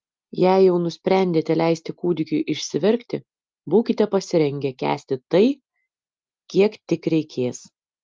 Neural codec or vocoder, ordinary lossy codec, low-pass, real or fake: none; Opus, 24 kbps; 7.2 kHz; real